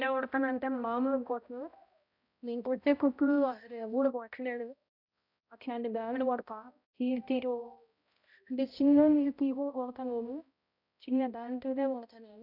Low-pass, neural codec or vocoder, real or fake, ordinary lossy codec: 5.4 kHz; codec, 16 kHz, 0.5 kbps, X-Codec, HuBERT features, trained on balanced general audio; fake; none